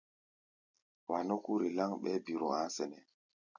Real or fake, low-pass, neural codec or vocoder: real; 7.2 kHz; none